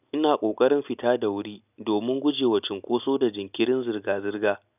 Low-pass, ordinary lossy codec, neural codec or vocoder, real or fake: 3.6 kHz; none; none; real